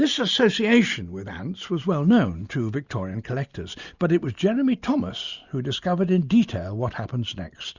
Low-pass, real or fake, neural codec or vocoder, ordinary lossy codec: 7.2 kHz; real; none; Opus, 64 kbps